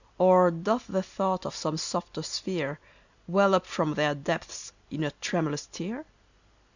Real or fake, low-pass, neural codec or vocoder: real; 7.2 kHz; none